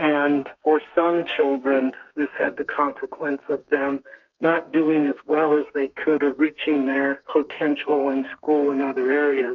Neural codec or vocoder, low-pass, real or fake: codec, 32 kHz, 1.9 kbps, SNAC; 7.2 kHz; fake